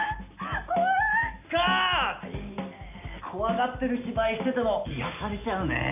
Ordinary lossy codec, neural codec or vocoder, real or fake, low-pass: AAC, 32 kbps; none; real; 3.6 kHz